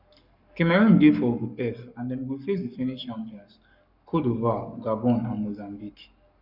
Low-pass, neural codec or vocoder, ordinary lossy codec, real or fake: 5.4 kHz; codec, 44.1 kHz, 7.8 kbps, Pupu-Codec; none; fake